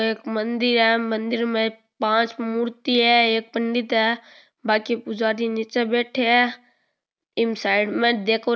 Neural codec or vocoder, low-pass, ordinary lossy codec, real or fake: none; none; none; real